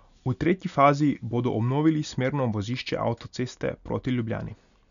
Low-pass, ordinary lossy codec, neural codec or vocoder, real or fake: 7.2 kHz; none; none; real